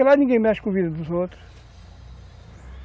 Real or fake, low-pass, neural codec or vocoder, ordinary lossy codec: real; none; none; none